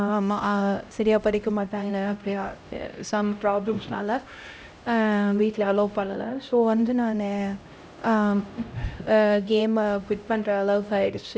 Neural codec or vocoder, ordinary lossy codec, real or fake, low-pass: codec, 16 kHz, 0.5 kbps, X-Codec, HuBERT features, trained on LibriSpeech; none; fake; none